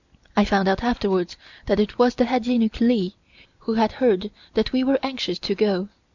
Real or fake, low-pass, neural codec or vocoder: real; 7.2 kHz; none